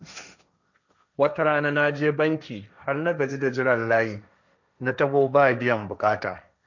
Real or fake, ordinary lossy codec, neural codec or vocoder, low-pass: fake; none; codec, 16 kHz, 1.1 kbps, Voila-Tokenizer; 7.2 kHz